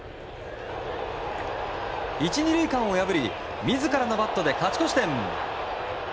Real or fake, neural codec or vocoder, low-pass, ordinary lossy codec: real; none; none; none